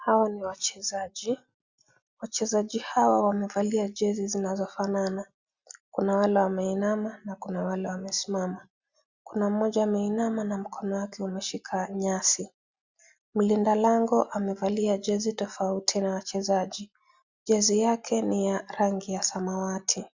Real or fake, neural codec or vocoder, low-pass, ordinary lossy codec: real; none; 7.2 kHz; Opus, 64 kbps